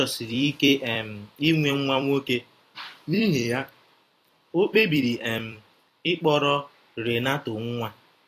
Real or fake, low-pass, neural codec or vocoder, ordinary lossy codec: fake; 14.4 kHz; vocoder, 44.1 kHz, 128 mel bands every 256 samples, BigVGAN v2; AAC, 48 kbps